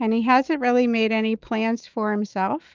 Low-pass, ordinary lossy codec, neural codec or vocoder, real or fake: 7.2 kHz; Opus, 24 kbps; autoencoder, 48 kHz, 128 numbers a frame, DAC-VAE, trained on Japanese speech; fake